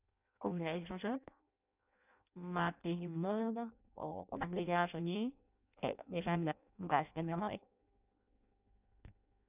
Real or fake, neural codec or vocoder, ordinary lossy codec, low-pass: fake; codec, 16 kHz in and 24 kHz out, 0.6 kbps, FireRedTTS-2 codec; none; 3.6 kHz